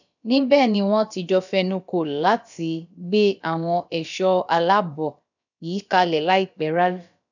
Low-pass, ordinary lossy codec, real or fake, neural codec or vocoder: 7.2 kHz; none; fake; codec, 16 kHz, about 1 kbps, DyCAST, with the encoder's durations